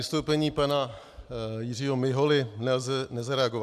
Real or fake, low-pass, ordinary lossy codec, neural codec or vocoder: real; 14.4 kHz; AAC, 96 kbps; none